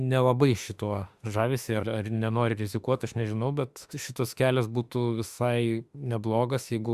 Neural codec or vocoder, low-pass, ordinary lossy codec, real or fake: autoencoder, 48 kHz, 32 numbers a frame, DAC-VAE, trained on Japanese speech; 14.4 kHz; Opus, 64 kbps; fake